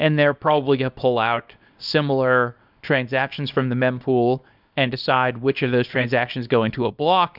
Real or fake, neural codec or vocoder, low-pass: fake; codec, 24 kHz, 0.9 kbps, WavTokenizer, small release; 5.4 kHz